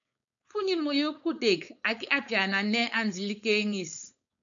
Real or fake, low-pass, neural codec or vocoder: fake; 7.2 kHz; codec, 16 kHz, 4.8 kbps, FACodec